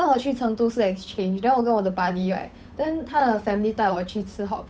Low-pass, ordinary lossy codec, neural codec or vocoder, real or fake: none; none; codec, 16 kHz, 8 kbps, FunCodec, trained on Chinese and English, 25 frames a second; fake